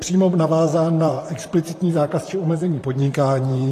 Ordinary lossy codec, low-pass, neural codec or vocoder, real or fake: AAC, 48 kbps; 14.4 kHz; vocoder, 44.1 kHz, 128 mel bands, Pupu-Vocoder; fake